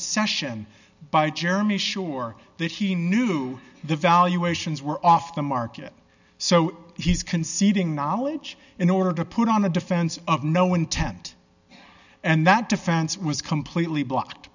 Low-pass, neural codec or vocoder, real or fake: 7.2 kHz; none; real